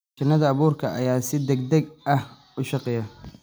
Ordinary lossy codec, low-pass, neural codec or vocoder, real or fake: none; none; none; real